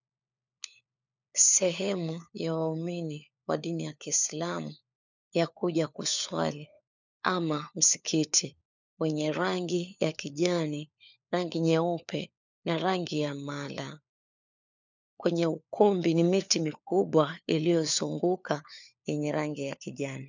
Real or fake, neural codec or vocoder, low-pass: fake; codec, 16 kHz, 4 kbps, FunCodec, trained on LibriTTS, 50 frames a second; 7.2 kHz